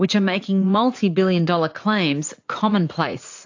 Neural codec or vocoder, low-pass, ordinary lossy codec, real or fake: vocoder, 44.1 kHz, 80 mel bands, Vocos; 7.2 kHz; AAC, 48 kbps; fake